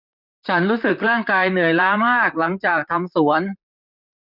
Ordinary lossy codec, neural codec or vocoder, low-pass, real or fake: none; vocoder, 44.1 kHz, 128 mel bands, Pupu-Vocoder; 5.4 kHz; fake